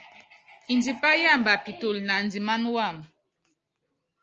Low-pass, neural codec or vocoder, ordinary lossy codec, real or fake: 7.2 kHz; none; Opus, 24 kbps; real